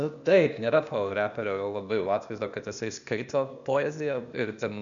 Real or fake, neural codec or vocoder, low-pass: fake; codec, 16 kHz, 0.8 kbps, ZipCodec; 7.2 kHz